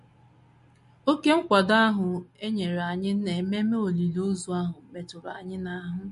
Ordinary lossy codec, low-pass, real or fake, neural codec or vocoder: MP3, 48 kbps; 14.4 kHz; real; none